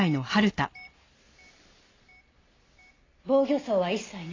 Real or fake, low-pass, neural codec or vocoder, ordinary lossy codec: real; 7.2 kHz; none; AAC, 32 kbps